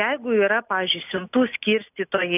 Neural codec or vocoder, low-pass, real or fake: none; 3.6 kHz; real